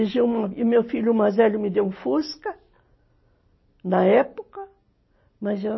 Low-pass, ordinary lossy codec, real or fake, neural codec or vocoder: 7.2 kHz; MP3, 24 kbps; real; none